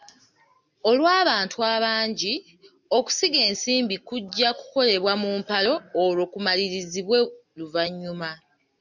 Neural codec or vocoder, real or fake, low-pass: none; real; 7.2 kHz